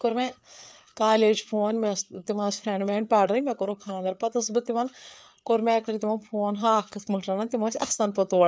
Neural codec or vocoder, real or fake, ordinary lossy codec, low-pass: codec, 16 kHz, 4 kbps, FreqCodec, larger model; fake; none; none